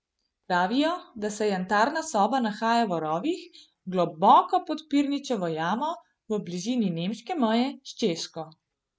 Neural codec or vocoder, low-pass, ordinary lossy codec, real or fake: none; none; none; real